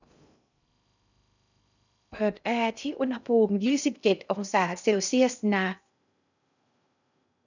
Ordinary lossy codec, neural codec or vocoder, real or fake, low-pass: none; codec, 16 kHz in and 24 kHz out, 0.6 kbps, FocalCodec, streaming, 2048 codes; fake; 7.2 kHz